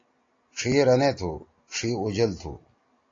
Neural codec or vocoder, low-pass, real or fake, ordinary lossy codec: none; 7.2 kHz; real; AAC, 32 kbps